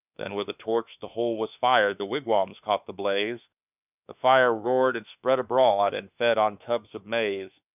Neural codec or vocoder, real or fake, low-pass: autoencoder, 48 kHz, 32 numbers a frame, DAC-VAE, trained on Japanese speech; fake; 3.6 kHz